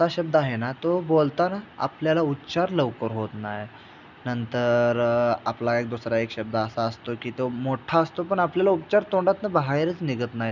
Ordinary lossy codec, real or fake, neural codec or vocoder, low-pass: none; real; none; 7.2 kHz